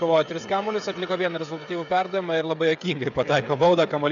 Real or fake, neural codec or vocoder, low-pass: fake; codec, 16 kHz, 16 kbps, FreqCodec, smaller model; 7.2 kHz